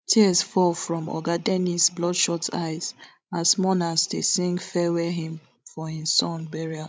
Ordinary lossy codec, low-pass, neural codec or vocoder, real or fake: none; none; codec, 16 kHz, 16 kbps, FreqCodec, larger model; fake